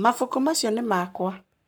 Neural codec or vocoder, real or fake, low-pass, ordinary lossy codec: codec, 44.1 kHz, 7.8 kbps, Pupu-Codec; fake; none; none